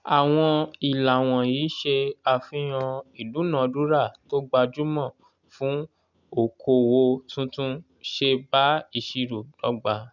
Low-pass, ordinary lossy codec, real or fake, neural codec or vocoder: 7.2 kHz; none; real; none